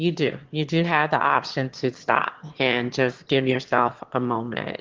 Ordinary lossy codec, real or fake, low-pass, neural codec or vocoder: Opus, 16 kbps; fake; 7.2 kHz; autoencoder, 22.05 kHz, a latent of 192 numbers a frame, VITS, trained on one speaker